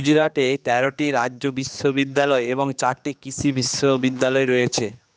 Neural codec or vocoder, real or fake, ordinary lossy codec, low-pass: codec, 16 kHz, 2 kbps, X-Codec, HuBERT features, trained on general audio; fake; none; none